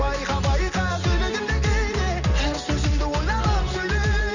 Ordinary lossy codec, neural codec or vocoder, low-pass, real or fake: none; none; 7.2 kHz; real